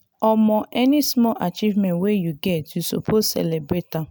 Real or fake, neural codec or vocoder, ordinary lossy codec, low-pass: real; none; none; none